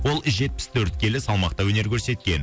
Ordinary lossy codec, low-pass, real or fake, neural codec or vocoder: none; none; real; none